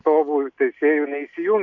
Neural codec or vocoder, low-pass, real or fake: none; 7.2 kHz; real